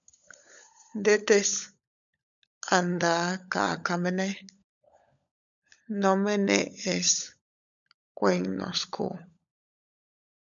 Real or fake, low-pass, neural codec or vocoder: fake; 7.2 kHz; codec, 16 kHz, 16 kbps, FunCodec, trained on LibriTTS, 50 frames a second